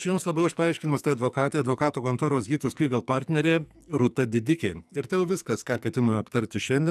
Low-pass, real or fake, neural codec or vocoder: 14.4 kHz; fake; codec, 44.1 kHz, 2.6 kbps, SNAC